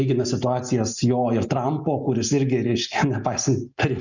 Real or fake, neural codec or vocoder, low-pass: real; none; 7.2 kHz